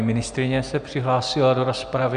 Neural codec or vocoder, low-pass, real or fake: none; 9.9 kHz; real